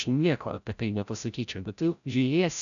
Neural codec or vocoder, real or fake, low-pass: codec, 16 kHz, 0.5 kbps, FreqCodec, larger model; fake; 7.2 kHz